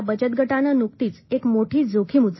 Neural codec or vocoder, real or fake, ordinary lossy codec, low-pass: none; real; MP3, 24 kbps; 7.2 kHz